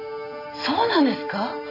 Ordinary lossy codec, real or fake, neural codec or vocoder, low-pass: AAC, 48 kbps; real; none; 5.4 kHz